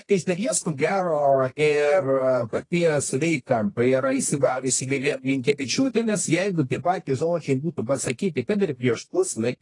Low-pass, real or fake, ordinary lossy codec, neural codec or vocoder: 10.8 kHz; fake; AAC, 32 kbps; codec, 24 kHz, 0.9 kbps, WavTokenizer, medium music audio release